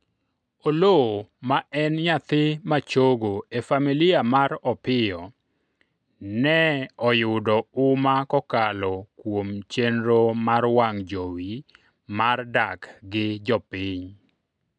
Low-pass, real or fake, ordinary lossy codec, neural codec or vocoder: 9.9 kHz; real; MP3, 96 kbps; none